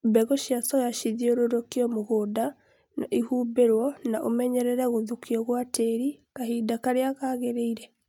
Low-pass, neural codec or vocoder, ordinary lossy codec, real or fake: 19.8 kHz; none; none; real